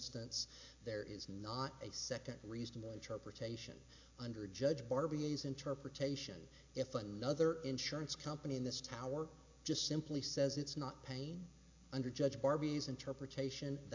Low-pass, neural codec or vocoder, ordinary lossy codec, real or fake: 7.2 kHz; none; AAC, 48 kbps; real